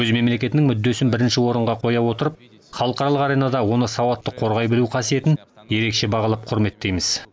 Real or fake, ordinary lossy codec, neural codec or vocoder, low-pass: real; none; none; none